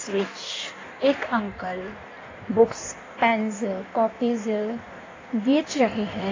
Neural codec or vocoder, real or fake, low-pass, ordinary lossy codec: codec, 16 kHz in and 24 kHz out, 1.1 kbps, FireRedTTS-2 codec; fake; 7.2 kHz; AAC, 32 kbps